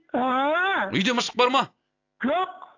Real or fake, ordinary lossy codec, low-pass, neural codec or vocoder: real; AAC, 48 kbps; 7.2 kHz; none